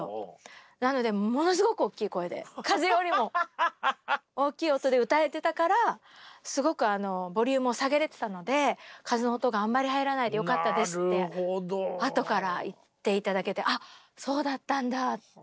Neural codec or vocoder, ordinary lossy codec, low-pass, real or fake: none; none; none; real